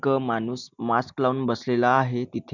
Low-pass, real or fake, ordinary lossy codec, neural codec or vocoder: 7.2 kHz; real; none; none